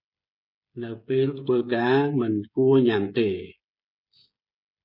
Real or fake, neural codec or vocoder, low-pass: fake; codec, 16 kHz, 16 kbps, FreqCodec, smaller model; 5.4 kHz